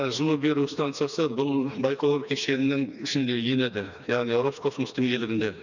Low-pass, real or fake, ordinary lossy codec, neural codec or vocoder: 7.2 kHz; fake; none; codec, 16 kHz, 2 kbps, FreqCodec, smaller model